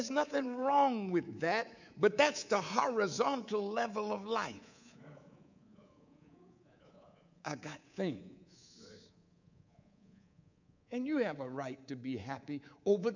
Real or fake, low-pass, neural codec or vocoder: fake; 7.2 kHz; codec, 24 kHz, 3.1 kbps, DualCodec